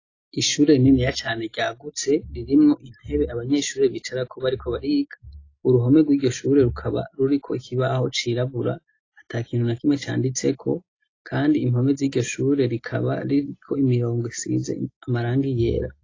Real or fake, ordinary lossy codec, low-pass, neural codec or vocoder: real; AAC, 32 kbps; 7.2 kHz; none